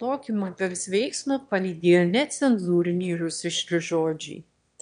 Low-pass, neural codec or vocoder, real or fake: 9.9 kHz; autoencoder, 22.05 kHz, a latent of 192 numbers a frame, VITS, trained on one speaker; fake